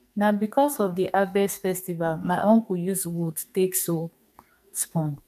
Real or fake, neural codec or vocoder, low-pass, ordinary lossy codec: fake; codec, 44.1 kHz, 2.6 kbps, SNAC; 14.4 kHz; none